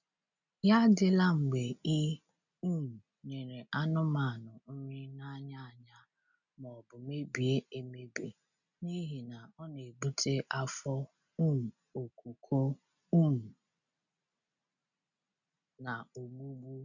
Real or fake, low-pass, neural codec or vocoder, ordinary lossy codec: real; 7.2 kHz; none; none